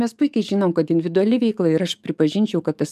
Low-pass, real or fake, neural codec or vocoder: 14.4 kHz; fake; codec, 44.1 kHz, 7.8 kbps, DAC